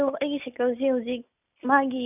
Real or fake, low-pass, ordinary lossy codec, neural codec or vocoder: real; 3.6 kHz; none; none